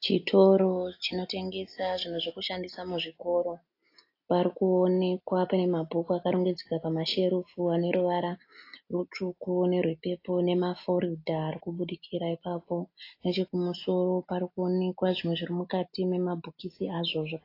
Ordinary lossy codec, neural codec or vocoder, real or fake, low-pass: AAC, 32 kbps; none; real; 5.4 kHz